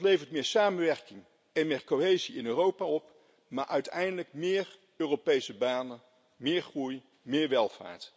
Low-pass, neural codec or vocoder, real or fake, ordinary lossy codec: none; none; real; none